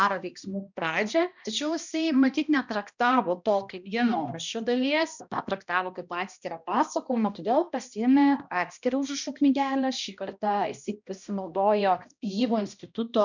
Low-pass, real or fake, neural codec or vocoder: 7.2 kHz; fake; codec, 16 kHz, 1 kbps, X-Codec, HuBERT features, trained on balanced general audio